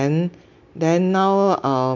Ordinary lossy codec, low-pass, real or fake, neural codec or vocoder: MP3, 48 kbps; 7.2 kHz; real; none